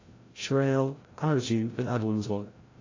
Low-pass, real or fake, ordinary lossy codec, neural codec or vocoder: 7.2 kHz; fake; AAC, 32 kbps; codec, 16 kHz, 0.5 kbps, FreqCodec, larger model